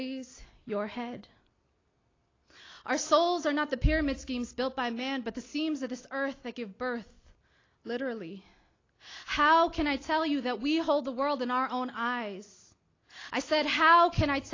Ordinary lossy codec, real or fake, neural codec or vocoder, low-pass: AAC, 32 kbps; real; none; 7.2 kHz